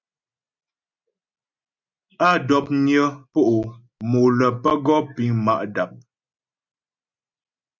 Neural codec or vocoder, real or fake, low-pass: none; real; 7.2 kHz